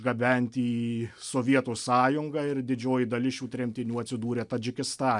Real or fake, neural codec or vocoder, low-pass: real; none; 10.8 kHz